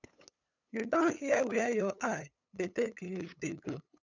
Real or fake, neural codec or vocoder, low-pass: fake; codec, 16 kHz, 8 kbps, FunCodec, trained on LibriTTS, 25 frames a second; 7.2 kHz